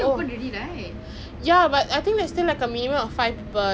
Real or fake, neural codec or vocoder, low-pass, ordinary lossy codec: real; none; none; none